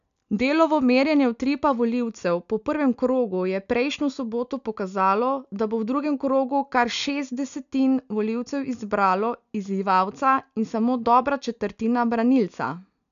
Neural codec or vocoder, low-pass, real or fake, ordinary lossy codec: none; 7.2 kHz; real; none